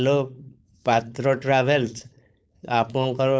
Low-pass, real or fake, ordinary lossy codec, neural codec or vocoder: none; fake; none; codec, 16 kHz, 4.8 kbps, FACodec